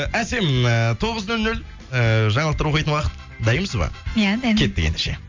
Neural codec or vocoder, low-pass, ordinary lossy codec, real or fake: none; 7.2 kHz; MP3, 64 kbps; real